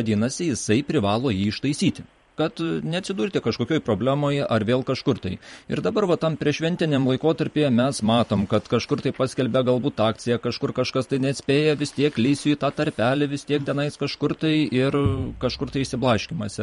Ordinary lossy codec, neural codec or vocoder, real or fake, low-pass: MP3, 48 kbps; vocoder, 44.1 kHz, 128 mel bands every 512 samples, BigVGAN v2; fake; 19.8 kHz